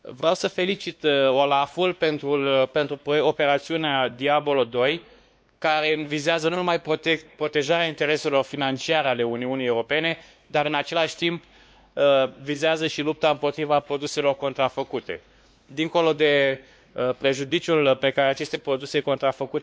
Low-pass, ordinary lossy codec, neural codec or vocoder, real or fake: none; none; codec, 16 kHz, 2 kbps, X-Codec, WavLM features, trained on Multilingual LibriSpeech; fake